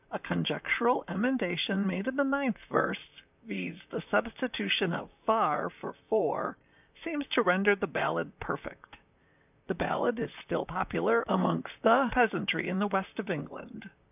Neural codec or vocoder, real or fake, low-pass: vocoder, 44.1 kHz, 128 mel bands, Pupu-Vocoder; fake; 3.6 kHz